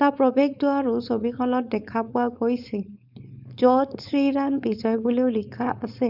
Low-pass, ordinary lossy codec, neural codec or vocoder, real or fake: 5.4 kHz; none; codec, 16 kHz, 4.8 kbps, FACodec; fake